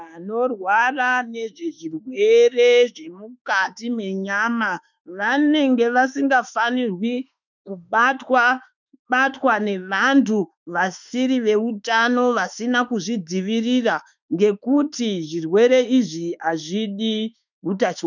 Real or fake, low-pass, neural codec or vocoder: fake; 7.2 kHz; autoencoder, 48 kHz, 32 numbers a frame, DAC-VAE, trained on Japanese speech